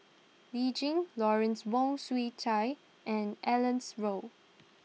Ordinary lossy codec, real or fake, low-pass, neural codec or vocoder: none; real; none; none